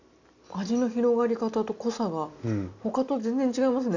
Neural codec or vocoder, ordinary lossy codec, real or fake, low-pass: none; none; real; 7.2 kHz